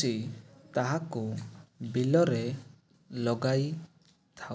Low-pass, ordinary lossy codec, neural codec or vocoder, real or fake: none; none; none; real